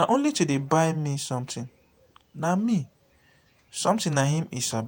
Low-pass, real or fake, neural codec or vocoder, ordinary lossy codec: none; fake; vocoder, 48 kHz, 128 mel bands, Vocos; none